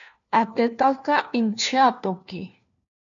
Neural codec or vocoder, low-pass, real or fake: codec, 16 kHz, 1 kbps, FunCodec, trained on LibriTTS, 50 frames a second; 7.2 kHz; fake